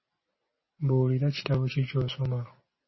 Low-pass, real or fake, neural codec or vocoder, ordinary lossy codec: 7.2 kHz; real; none; MP3, 24 kbps